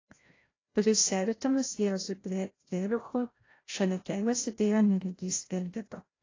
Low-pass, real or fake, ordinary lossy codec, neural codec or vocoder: 7.2 kHz; fake; AAC, 32 kbps; codec, 16 kHz, 0.5 kbps, FreqCodec, larger model